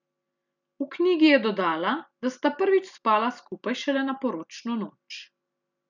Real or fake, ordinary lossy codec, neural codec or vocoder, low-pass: real; none; none; 7.2 kHz